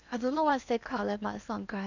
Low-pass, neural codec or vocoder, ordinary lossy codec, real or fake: 7.2 kHz; codec, 16 kHz in and 24 kHz out, 0.8 kbps, FocalCodec, streaming, 65536 codes; none; fake